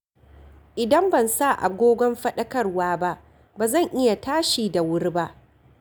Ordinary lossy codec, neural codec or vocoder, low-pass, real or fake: none; none; none; real